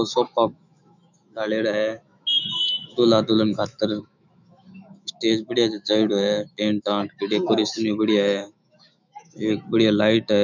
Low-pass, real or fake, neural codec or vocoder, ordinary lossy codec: 7.2 kHz; fake; autoencoder, 48 kHz, 128 numbers a frame, DAC-VAE, trained on Japanese speech; none